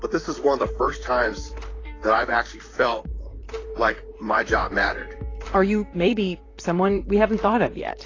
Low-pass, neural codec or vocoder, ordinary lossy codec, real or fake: 7.2 kHz; vocoder, 44.1 kHz, 128 mel bands, Pupu-Vocoder; AAC, 32 kbps; fake